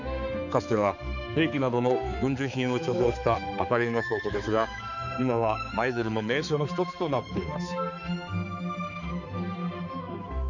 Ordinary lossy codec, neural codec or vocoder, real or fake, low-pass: none; codec, 16 kHz, 4 kbps, X-Codec, HuBERT features, trained on balanced general audio; fake; 7.2 kHz